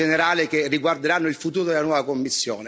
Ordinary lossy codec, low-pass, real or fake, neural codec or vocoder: none; none; real; none